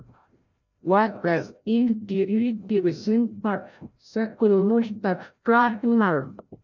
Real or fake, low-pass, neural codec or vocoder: fake; 7.2 kHz; codec, 16 kHz, 0.5 kbps, FreqCodec, larger model